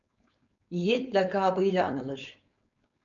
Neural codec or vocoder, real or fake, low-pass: codec, 16 kHz, 4.8 kbps, FACodec; fake; 7.2 kHz